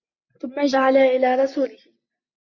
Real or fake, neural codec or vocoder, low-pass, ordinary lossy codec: real; none; 7.2 kHz; MP3, 48 kbps